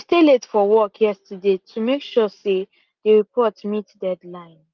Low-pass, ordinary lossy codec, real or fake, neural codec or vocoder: 7.2 kHz; Opus, 24 kbps; real; none